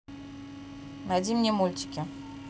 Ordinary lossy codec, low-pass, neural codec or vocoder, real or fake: none; none; none; real